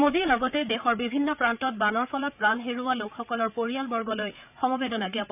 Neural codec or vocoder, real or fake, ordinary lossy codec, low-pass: codec, 16 kHz, 8 kbps, FreqCodec, larger model; fake; none; 3.6 kHz